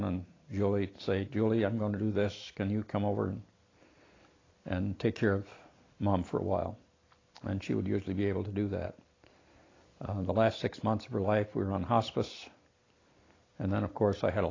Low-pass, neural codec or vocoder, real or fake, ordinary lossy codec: 7.2 kHz; none; real; AAC, 32 kbps